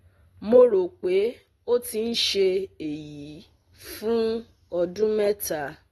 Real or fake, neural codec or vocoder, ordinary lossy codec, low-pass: real; none; AAC, 32 kbps; 19.8 kHz